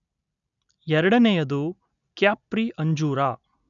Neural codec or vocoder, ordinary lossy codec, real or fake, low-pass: none; none; real; 7.2 kHz